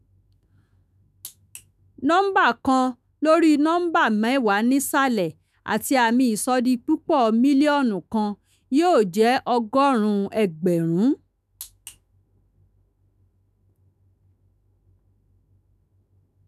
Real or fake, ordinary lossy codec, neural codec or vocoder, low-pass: fake; none; autoencoder, 48 kHz, 128 numbers a frame, DAC-VAE, trained on Japanese speech; 14.4 kHz